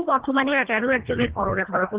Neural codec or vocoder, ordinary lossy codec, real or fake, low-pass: codec, 24 kHz, 1.5 kbps, HILCodec; Opus, 16 kbps; fake; 3.6 kHz